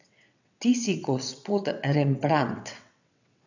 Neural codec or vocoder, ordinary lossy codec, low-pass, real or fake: vocoder, 22.05 kHz, 80 mel bands, WaveNeXt; none; 7.2 kHz; fake